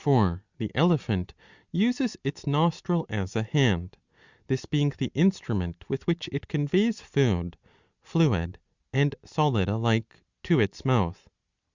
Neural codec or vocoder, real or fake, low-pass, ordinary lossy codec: none; real; 7.2 kHz; Opus, 64 kbps